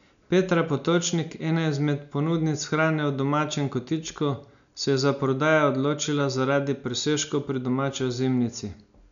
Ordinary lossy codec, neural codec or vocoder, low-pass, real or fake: none; none; 7.2 kHz; real